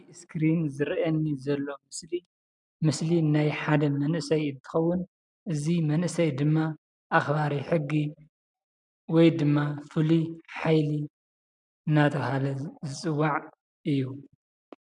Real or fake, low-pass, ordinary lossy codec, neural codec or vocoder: real; 10.8 kHz; MP3, 96 kbps; none